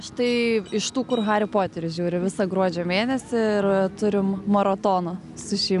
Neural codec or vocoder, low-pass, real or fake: none; 10.8 kHz; real